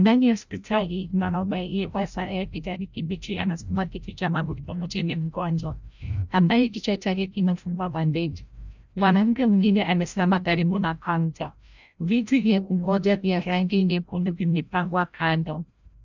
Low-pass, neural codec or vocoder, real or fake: 7.2 kHz; codec, 16 kHz, 0.5 kbps, FreqCodec, larger model; fake